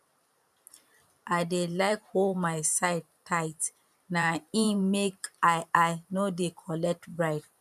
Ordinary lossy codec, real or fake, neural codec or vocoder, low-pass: none; fake; vocoder, 44.1 kHz, 128 mel bands every 512 samples, BigVGAN v2; 14.4 kHz